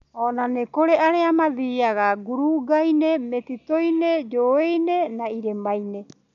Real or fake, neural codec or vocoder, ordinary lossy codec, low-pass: real; none; none; 7.2 kHz